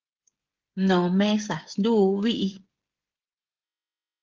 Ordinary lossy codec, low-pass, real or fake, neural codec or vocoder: Opus, 16 kbps; 7.2 kHz; fake; codec, 16 kHz, 16 kbps, FreqCodec, smaller model